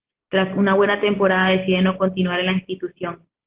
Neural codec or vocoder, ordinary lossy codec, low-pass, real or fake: none; Opus, 16 kbps; 3.6 kHz; real